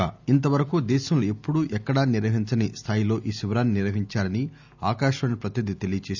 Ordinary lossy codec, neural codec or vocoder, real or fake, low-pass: none; none; real; 7.2 kHz